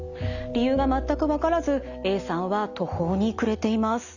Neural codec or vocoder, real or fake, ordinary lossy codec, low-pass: none; real; none; 7.2 kHz